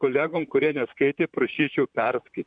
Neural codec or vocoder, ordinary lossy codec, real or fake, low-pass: vocoder, 44.1 kHz, 128 mel bands, Pupu-Vocoder; MP3, 96 kbps; fake; 9.9 kHz